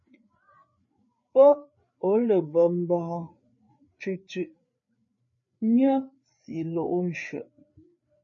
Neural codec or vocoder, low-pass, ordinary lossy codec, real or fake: codec, 16 kHz, 4 kbps, FreqCodec, larger model; 7.2 kHz; MP3, 32 kbps; fake